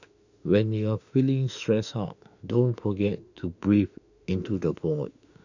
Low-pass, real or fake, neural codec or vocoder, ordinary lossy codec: 7.2 kHz; fake; autoencoder, 48 kHz, 32 numbers a frame, DAC-VAE, trained on Japanese speech; none